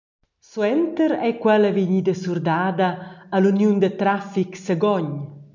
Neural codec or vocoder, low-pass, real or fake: none; 7.2 kHz; real